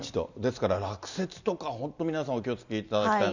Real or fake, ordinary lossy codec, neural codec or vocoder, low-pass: real; none; none; 7.2 kHz